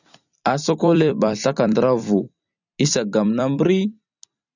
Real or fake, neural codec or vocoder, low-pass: fake; vocoder, 44.1 kHz, 128 mel bands every 256 samples, BigVGAN v2; 7.2 kHz